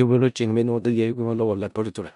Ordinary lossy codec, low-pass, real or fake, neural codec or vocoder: none; 10.8 kHz; fake; codec, 16 kHz in and 24 kHz out, 0.4 kbps, LongCat-Audio-Codec, four codebook decoder